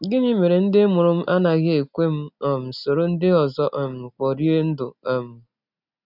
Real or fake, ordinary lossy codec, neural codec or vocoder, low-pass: real; none; none; 5.4 kHz